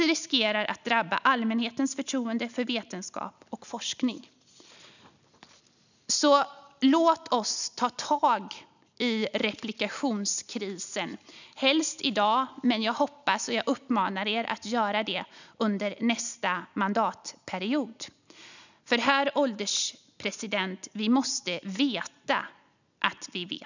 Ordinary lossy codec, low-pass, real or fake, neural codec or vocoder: none; 7.2 kHz; real; none